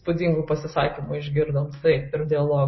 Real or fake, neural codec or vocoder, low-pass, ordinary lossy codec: real; none; 7.2 kHz; MP3, 24 kbps